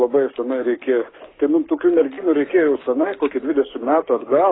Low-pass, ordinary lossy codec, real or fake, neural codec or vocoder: 7.2 kHz; AAC, 16 kbps; fake; codec, 16 kHz, 6 kbps, DAC